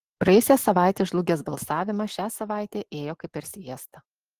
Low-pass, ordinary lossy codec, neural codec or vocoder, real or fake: 14.4 kHz; Opus, 16 kbps; none; real